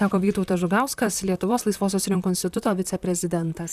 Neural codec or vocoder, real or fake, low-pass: vocoder, 44.1 kHz, 128 mel bands, Pupu-Vocoder; fake; 14.4 kHz